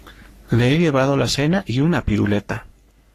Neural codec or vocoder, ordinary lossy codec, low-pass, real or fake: codec, 44.1 kHz, 3.4 kbps, Pupu-Codec; AAC, 48 kbps; 14.4 kHz; fake